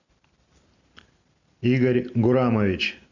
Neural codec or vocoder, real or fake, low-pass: none; real; 7.2 kHz